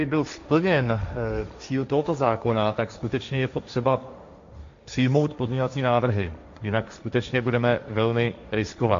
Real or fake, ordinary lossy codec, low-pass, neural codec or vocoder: fake; MP3, 64 kbps; 7.2 kHz; codec, 16 kHz, 1.1 kbps, Voila-Tokenizer